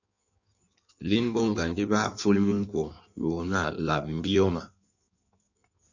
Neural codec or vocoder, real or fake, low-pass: codec, 16 kHz in and 24 kHz out, 1.1 kbps, FireRedTTS-2 codec; fake; 7.2 kHz